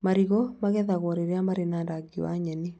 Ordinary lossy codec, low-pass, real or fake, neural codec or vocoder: none; none; real; none